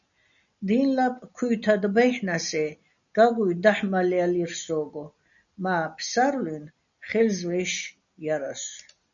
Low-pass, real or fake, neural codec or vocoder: 7.2 kHz; real; none